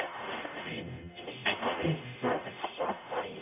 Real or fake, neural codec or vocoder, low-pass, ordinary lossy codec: fake; codec, 44.1 kHz, 0.9 kbps, DAC; 3.6 kHz; none